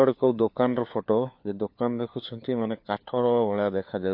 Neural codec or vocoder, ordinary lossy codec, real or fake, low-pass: codec, 16 kHz, 4 kbps, FunCodec, trained on LibriTTS, 50 frames a second; MP3, 32 kbps; fake; 5.4 kHz